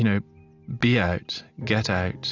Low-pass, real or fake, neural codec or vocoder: 7.2 kHz; real; none